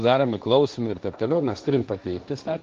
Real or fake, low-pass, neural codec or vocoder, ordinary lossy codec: fake; 7.2 kHz; codec, 16 kHz, 1.1 kbps, Voila-Tokenizer; Opus, 32 kbps